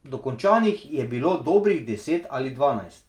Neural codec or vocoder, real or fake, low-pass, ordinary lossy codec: none; real; 19.8 kHz; Opus, 32 kbps